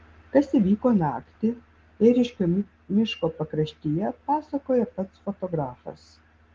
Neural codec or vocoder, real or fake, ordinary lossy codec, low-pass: none; real; Opus, 16 kbps; 7.2 kHz